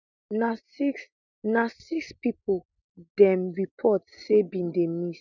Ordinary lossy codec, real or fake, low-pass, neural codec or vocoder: none; real; 7.2 kHz; none